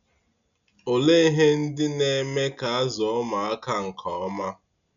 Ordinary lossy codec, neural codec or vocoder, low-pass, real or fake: none; none; 7.2 kHz; real